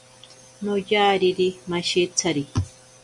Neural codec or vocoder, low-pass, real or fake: none; 10.8 kHz; real